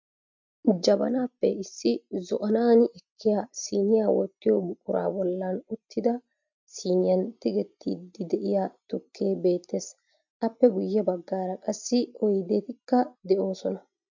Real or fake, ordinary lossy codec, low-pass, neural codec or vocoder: real; MP3, 64 kbps; 7.2 kHz; none